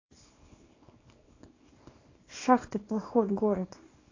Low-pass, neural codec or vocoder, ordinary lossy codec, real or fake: 7.2 kHz; codec, 24 kHz, 0.9 kbps, WavTokenizer, small release; AAC, 32 kbps; fake